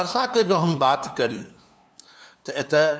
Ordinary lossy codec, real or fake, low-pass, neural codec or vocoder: none; fake; none; codec, 16 kHz, 2 kbps, FunCodec, trained on LibriTTS, 25 frames a second